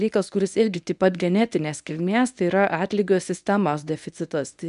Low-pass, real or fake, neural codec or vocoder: 10.8 kHz; fake; codec, 24 kHz, 0.9 kbps, WavTokenizer, medium speech release version 1